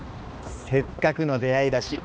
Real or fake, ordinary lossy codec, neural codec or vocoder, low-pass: fake; none; codec, 16 kHz, 2 kbps, X-Codec, HuBERT features, trained on balanced general audio; none